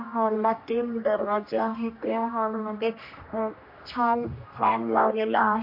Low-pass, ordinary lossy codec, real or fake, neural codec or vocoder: 5.4 kHz; MP3, 32 kbps; fake; codec, 16 kHz, 1 kbps, X-Codec, HuBERT features, trained on general audio